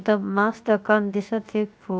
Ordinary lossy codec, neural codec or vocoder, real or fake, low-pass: none; codec, 16 kHz, 0.7 kbps, FocalCodec; fake; none